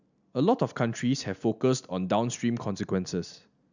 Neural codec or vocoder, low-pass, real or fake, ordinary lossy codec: none; 7.2 kHz; real; none